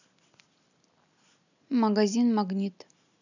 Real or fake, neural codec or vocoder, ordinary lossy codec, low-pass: real; none; none; 7.2 kHz